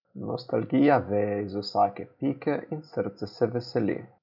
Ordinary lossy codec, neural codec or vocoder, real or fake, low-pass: none; none; real; 5.4 kHz